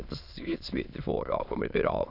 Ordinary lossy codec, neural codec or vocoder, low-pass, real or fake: none; autoencoder, 22.05 kHz, a latent of 192 numbers a frame, VITS, trained on many speakers; 5.4 kHz; fake